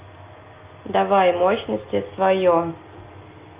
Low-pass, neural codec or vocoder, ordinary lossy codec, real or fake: 3.6 kHz; none; Opus, 24 kbps; real